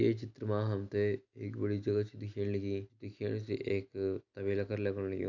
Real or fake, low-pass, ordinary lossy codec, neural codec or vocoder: real; 7.2 kHz; AAC, 48 kbps; none